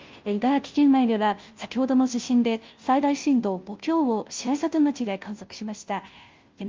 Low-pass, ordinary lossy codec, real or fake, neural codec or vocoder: 7.2 kHz; Opus, 32 kbps; fake; codec, 16 kHz, 0.5 kbps, FunCodec, trained on Chinese and English, 25 frames a second